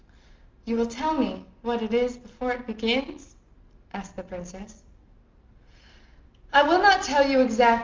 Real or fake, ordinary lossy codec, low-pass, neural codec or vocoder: real; Opus, 24 kbps; 7.2 kHz; none